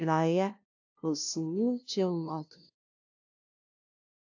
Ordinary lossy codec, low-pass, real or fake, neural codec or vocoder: AAC, 48 kbps; 7.2 kHz; fake; codec, 16 kHz, 0.5 kbps, FunCodec, trained on LibriTTS, 25 frames a second